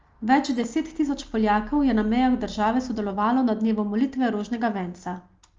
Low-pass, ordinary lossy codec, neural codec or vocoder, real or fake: 7.2 kHz; Opus, 24 kbps; none; real